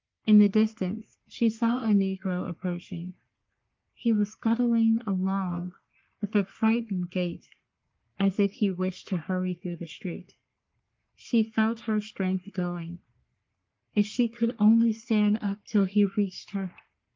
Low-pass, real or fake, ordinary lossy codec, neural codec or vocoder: 7.2 kHz; fake; Opus, 32 kbps; codec, 44.1 kHz, 3.4 kbps, Pupu-Codec